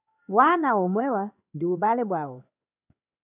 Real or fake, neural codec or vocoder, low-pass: fake; codec, 16 kHz in and 24 kHz out, 1 kbps, XY-Tokenizer; 3.6 kHz